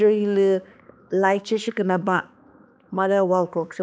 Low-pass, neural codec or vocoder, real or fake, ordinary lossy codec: none; codec, 16 kHz, 2 kbps, X-Codec, HuBERT features, trained on balanced general audio; fake; none